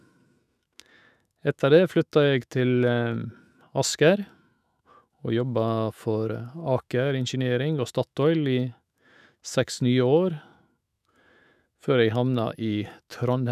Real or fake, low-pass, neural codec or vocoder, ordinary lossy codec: fake; 14.4 kHz; autoencoder, 48 kHz, 128 numbers a frame, DAC-VAE, trained on Japanese speech; none